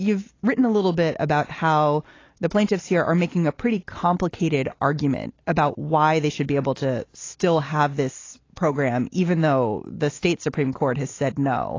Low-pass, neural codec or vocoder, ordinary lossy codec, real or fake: 7.2 kHz; none; AAC, 32 kbps; real